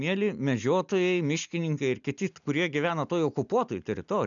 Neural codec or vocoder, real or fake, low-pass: none; real; 7.2 kHz